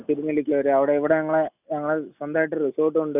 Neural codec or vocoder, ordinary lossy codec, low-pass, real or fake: none; none; 3.6 kHz; real